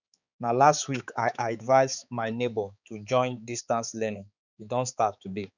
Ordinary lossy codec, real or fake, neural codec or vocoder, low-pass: none; fake; codec, 16 kHz, 4 kbps, X-Codec, HuBERT features, trained on balanced general audio; 7.2 kHz